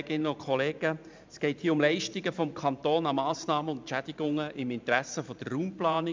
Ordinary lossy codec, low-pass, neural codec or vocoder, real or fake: MP3, 64 kbps; 7.2 kHz; vocoder, 44.1 kHz, 128 mel bands every 512 samples, BigVGAN v2; fake